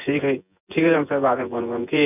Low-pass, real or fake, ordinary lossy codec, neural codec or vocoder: 3.6 kHz; fake; none; vocoder, 24 kHz, 100 mel bands, Vocos